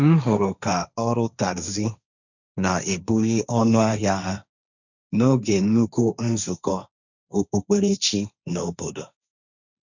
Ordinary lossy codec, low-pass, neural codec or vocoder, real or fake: none; 7.2 kHz; codec, 16 kHz, 1.1 kbps, Voila-Tokenizer; fake